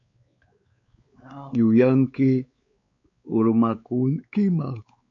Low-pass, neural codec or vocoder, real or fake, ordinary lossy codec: 7.2 kHz; codec, 16 kHz, 4 kbps, X-Codec, WavLM features, trained on Multilingual LibriSpeech; fake; MP3, 48 kbps